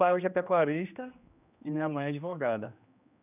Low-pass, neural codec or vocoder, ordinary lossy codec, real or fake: 3.6 kHz; codec, 16 kHz, 1 kbps, X-Codec, HuBERT features, trained on general audio; none; fake